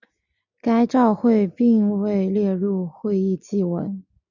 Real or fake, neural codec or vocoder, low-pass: real; none; 7.2 kHz